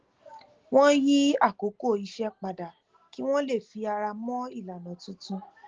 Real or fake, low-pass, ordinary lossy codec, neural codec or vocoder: real; 7.2 kHz; Opus, 16 kbps; none